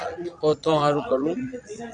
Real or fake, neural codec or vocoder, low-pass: fake; vocoder, 22.05 kHz, 80 mel bands, Vocos; 9.9 kHz